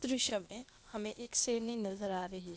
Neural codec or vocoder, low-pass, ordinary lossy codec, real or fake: codec, 16 kHz, 0.8 kbps, ZipCodec; none; none; fake